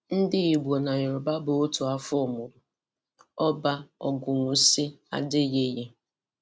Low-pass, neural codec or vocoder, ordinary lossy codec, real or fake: none; none; none; real